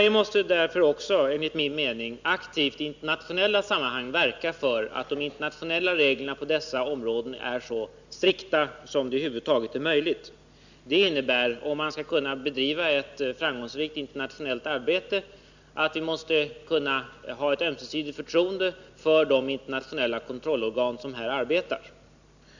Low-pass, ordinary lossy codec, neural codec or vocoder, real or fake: 7.2 kHz; none; none; real